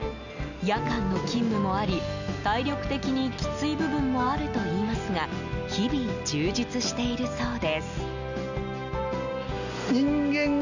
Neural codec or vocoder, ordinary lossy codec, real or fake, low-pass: none; none; real; 7.2 kHz